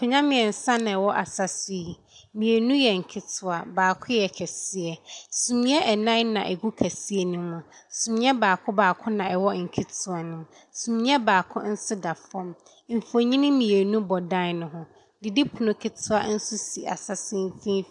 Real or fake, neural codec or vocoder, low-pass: real; none; 10.8 kHz